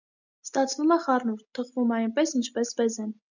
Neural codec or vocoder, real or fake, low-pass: none; real; 7.2 kHz